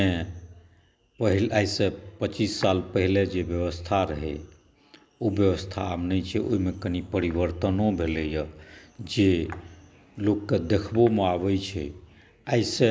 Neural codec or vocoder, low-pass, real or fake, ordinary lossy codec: none; none; real; none